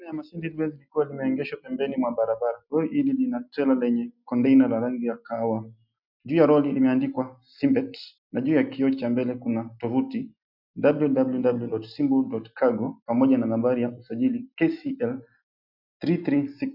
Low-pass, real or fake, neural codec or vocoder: 5.4 kHz; real; none